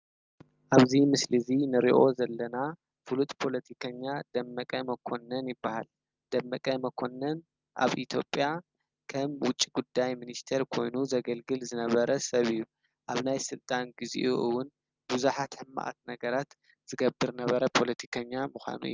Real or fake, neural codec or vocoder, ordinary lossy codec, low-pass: real; none; Opus, 24 kbps; 7.2 kHz